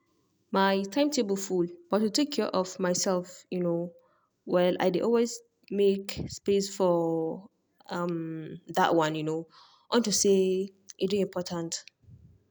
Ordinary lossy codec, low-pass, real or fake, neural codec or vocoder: none; none; real; none